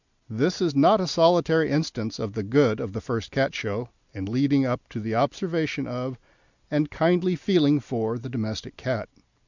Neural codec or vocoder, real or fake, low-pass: none; real; 7.2 kHz